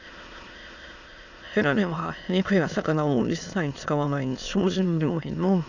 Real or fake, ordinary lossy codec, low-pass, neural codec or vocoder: fake; none; 7.2 kHz; autoencoder, 22.05 kHz, a latent of 192 numbers a frame, VITS, trained on many speakers